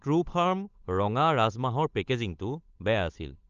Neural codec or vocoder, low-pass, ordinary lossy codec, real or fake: codec, 16 kHz, 8 kbps, FunCodec, trained on Chinese and English, 25 frames a second; 7.2 kHz; Opus, 24 kbps; fake